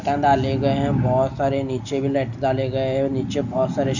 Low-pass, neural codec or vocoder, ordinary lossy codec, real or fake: 7.2 kHz; none; none; real